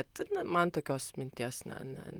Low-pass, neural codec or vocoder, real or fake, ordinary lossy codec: 19.8 kHz; vocoder, 44.1 kHz, 128 mel bands, Pupu-Vocoder; fake; Opus, 32 kbps